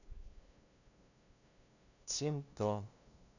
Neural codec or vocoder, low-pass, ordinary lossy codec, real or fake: codec, 16 kHz, 0.5 kbps, FunCodec, trained on LibriTTS, 25 frames a second; 7.2 kHz; none; fake